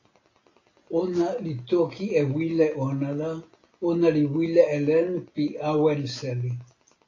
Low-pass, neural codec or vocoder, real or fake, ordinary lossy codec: 7.2 kHz; none; real; AAC, 32 kbps